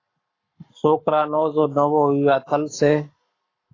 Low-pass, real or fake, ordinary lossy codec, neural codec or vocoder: 7.2 kHz; fake; AAC, 32 kbps; codec, 44.1 kHz, 7.8 kbps, Pupu-Codec